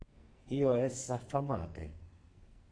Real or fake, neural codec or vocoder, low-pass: fake; codec, 44.1 kHz, 2.6 kbps, SNAC; 9.9 kHz